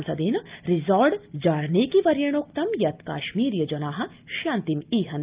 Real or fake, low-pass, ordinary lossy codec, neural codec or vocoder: real; 3.6 kHz; Opus, 32 kbps; none